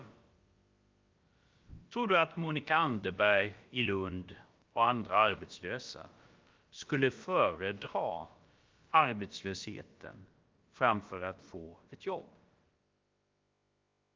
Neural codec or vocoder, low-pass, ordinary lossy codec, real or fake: codec, 16 kHz, about 1 kbps, DyCAST, with the encoder's durations; 7.2 kHz; Opus, 24 kbps; fake